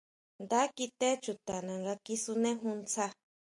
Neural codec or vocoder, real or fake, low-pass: none; real; 10.8 kHz